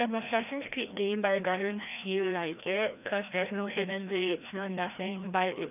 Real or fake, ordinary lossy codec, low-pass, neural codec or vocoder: fake; none; 3.6 kHz; codec, 16 kHz, 1 kbps, FreqCodec, larger model